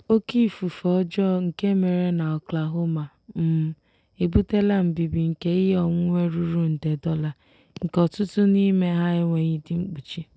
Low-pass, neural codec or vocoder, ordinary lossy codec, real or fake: none; none; none; real